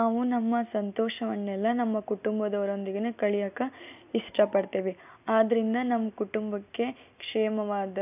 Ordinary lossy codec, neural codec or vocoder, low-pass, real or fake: AAC, 32 kbps; none; 3.6 kHz; real